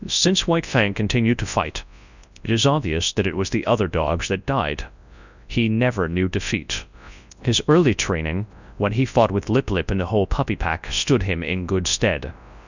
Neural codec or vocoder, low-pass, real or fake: codec, 24 kHz, 0.9 kbps, WavTokenizer, large speech release; 7.2 kHz; fake